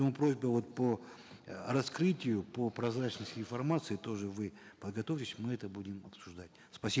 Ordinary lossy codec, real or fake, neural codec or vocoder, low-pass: none; real; none; none